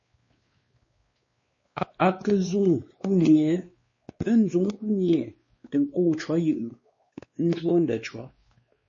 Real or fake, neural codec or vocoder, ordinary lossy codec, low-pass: fake; codec, 16 kHz, 2 kbps, X-Codec, WavLM features, trained on Multilingual LibriSpeech; MP3, 32 kbps; 7.2 kHz